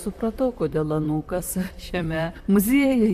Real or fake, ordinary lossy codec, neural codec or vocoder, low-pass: fake; MP3, 64 kbps; vocoder, 44.1 kHz, 128 mel bands, Pupu-Vocoder; 14.4 kHz